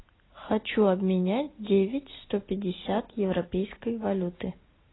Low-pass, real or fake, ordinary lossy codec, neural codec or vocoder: 7.2 kHz; real; AAC, 16 kbps; none